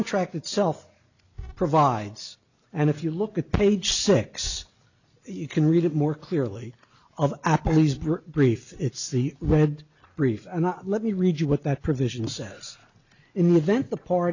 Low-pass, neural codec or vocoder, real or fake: 7.2 kHz; none; real